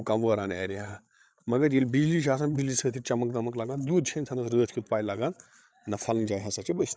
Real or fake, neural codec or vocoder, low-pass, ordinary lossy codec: fake; codec, 16 kHz, 8 kbps, FreqCodec, larger model; none; none